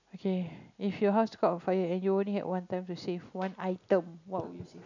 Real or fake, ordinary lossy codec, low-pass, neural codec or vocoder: real; none; 7.2 kHz; none